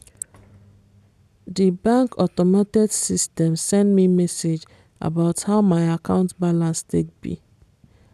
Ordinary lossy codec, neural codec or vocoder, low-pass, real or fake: none; none; 14.4 kHz; real